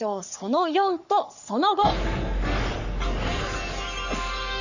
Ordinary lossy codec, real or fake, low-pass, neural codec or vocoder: none; fake; 7.2 kHz; codec, 44.1 kHz, 3.4 kbps, Pupu-Codec